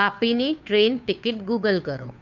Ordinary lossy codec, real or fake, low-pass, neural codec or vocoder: none; fake; 7.2 kHz; codec, 16 kHz, 2 kbps, FunCodec, trained on Chinese and English, 25 frames a second